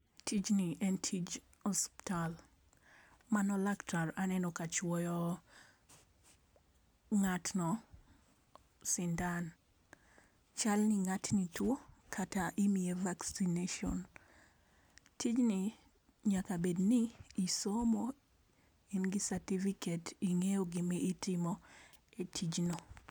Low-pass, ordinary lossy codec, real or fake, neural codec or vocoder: none; none; real; none